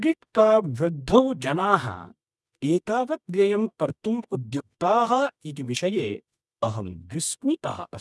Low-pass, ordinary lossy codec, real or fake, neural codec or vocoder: none; none; fake; codec, 24 kHz, 0.9 kbps, WavTokenizer, medium music audio release